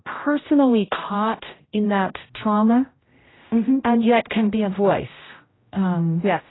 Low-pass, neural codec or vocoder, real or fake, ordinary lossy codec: 7.2 kHz; codec, 16 kHz, 0.5 kbps, X-Codec, HuBERT features, trained on general audio; fake; AAC, 16 kbps